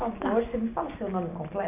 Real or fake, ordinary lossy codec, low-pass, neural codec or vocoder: real; none; 3.6 kHz; none